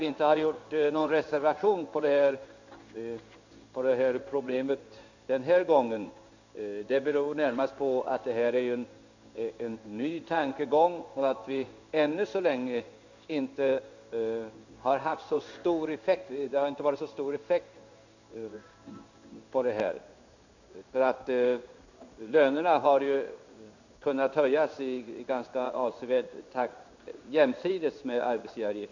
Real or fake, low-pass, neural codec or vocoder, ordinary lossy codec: fake; 7.2 kHz; codec, 16 kHz in and 24 kHz out, 1 kbps, XY-Tokenizer; none